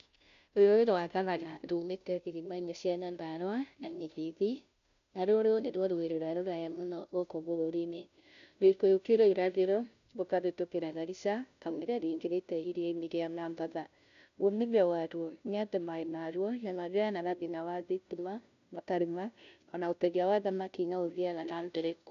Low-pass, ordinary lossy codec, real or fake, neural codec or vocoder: 7.2 kHz; none; fake; codec, 16 kHz, 0.5 kbps, FunCodec, trained on Chinese and English, 25 frames a second